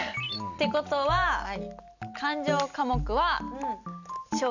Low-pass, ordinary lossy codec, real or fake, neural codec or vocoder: 7.2 kHz; none; real; none